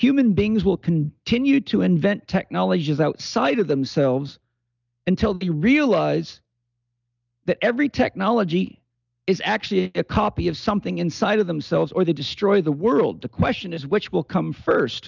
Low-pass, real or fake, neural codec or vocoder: 7.2 kHz; real; none